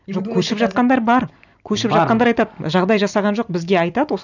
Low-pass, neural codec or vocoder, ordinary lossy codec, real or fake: 7.2 kHz; none; none; real